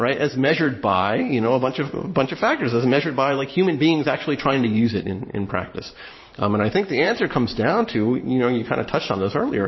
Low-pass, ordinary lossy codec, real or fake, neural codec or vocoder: 7.2 kHz; MP3, 24 kbps; fake; vocoder, 44.1 kHz, 128 mel bands every 512 samples, BigVGAN v2